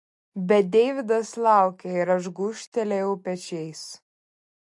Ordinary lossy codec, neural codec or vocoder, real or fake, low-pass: MP3, 48 kbps; none; real; 10.8 kHz